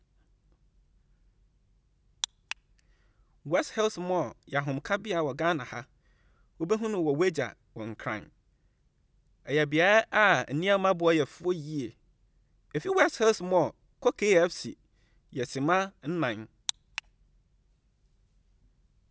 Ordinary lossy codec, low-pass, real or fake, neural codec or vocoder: none; none; real; none